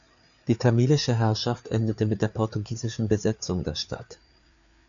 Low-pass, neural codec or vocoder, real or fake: 7.2 kHz; codec, 16 kHz, 4 kbps, FreqCodec, larger model; fake